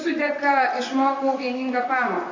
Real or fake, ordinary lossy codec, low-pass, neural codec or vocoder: fake; AAC, 48 kbps; 7.2 kHz; vocoder, 44.1 kHz, 128 mel bands, Pupu-Vocoder